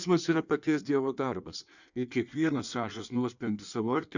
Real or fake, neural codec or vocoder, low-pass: fake; codec, 16 kHz in and 24 kHz out, 1.1 kbps, FireRedTTS-2 codec; 7.2 kHz